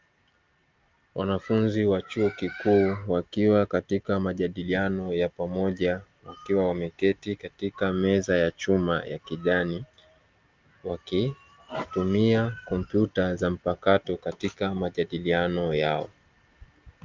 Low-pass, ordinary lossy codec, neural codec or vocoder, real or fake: 7.2 kHz; Opus, 24 kbps; none; real